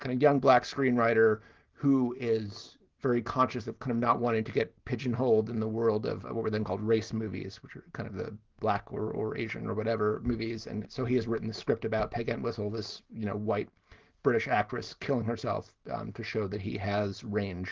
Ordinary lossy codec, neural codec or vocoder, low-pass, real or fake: Opus, 16 kbps; none; 7.2 kHz; real